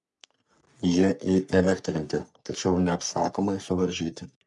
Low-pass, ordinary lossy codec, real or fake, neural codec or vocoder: 10.8 kHz; MP3, 96 kbps; fake; codec, 44.1 kHz, 3.4 kbps, Pupu-Codec